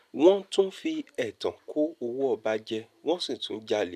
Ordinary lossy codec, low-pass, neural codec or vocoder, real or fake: none; 14.4 kHz; none; real